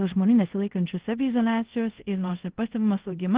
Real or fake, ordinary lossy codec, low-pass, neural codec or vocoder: fake; Opus, 16 kbps; 3.6 kHz; codec, 24 kHz, 0.5 kbps, DualCodec